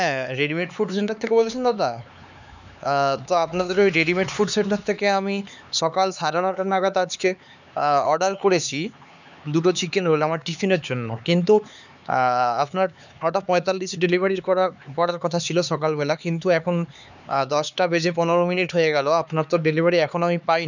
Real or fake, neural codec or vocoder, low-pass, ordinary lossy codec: fake; codec, 16 kHz, 4 kbps, X-Codec, HuBERT features, trained on LibriSpeech; 7.2 kHz; none